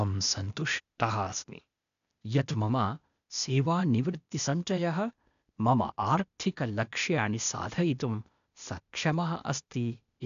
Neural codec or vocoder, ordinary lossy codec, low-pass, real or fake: codec, 16 kHz, 0.8 kbps, ZipCodec; none; 7.2 kHz; fake